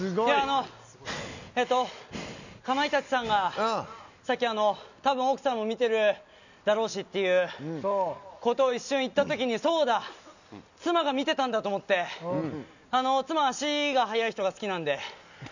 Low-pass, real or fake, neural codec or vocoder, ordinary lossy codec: 7.2 kHz; real; none; none